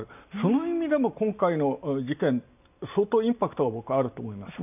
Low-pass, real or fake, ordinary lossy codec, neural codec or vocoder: 3.6 kHz; real; none; none